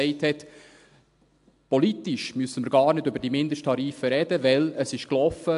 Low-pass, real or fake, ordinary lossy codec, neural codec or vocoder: 10.8 kHz; real; AAC, 64 kbps; none